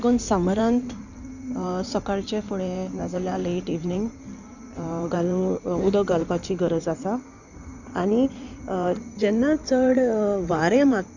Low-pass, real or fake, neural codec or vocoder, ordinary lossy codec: 7.2 kHz; fake; codec, 16 kHz in and 24 kHz out, 2.2 kbps, FireRedTTS-2 codec; none